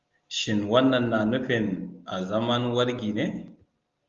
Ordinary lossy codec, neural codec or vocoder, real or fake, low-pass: Opus, 32 kbps; none; real; 7.2 kHz